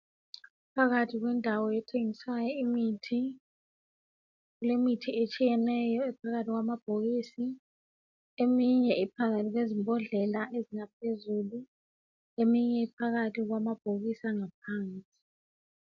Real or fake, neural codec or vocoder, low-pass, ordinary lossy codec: real; none; 7.2 kHz; MP3, 64 kbps